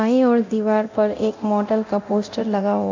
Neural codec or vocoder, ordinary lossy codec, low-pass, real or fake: codec, 24 kHz, 0.9 kbps, DualCodec; MP3, 64 kbps; 7.2 kHz; fake